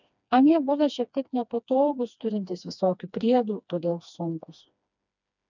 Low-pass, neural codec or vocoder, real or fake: 7.2 kHz; codec, 16 kHz, 2 kbps, FreqCodec, smaller model; fake